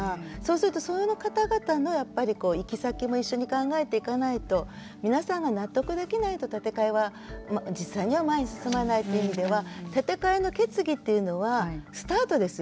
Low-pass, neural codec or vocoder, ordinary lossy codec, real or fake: none; none; none; real